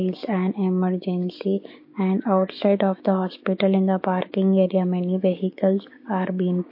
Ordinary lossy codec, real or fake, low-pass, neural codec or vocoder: MP3, 32 kbps; fake; 5.4 kHz; codec, 16 kHz, 6 kbps, DAC